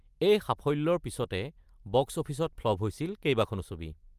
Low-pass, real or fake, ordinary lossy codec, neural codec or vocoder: 14.4 kHz; real; Opus, 32 kbps; none